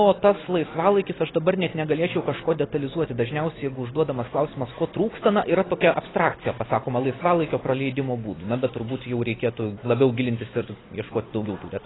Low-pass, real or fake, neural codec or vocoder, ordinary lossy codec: 7.2 kHz; real; none; AAC, 16 kbps